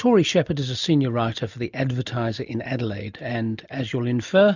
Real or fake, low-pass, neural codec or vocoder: real; 7.2 kHz; none